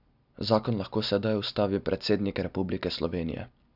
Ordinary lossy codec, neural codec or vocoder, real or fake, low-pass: none; none; real; 5.4 kHz